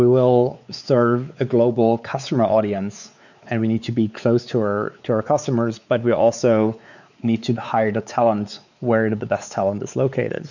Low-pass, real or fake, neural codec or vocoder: 7.2 kHz; fake; codec, 16 kHz, 4 kbps, X-Codec, WavLM features, trained on Multilingual LibriSpeech